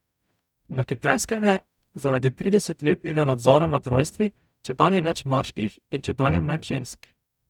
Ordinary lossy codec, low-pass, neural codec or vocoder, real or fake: none; 19.8 kHz; codec, 44.1 kHz, 0.9 kbps, DAC; fake